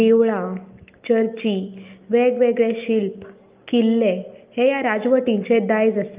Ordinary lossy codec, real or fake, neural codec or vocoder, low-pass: Opus, 24 kbps; real; none; 3.6 kHz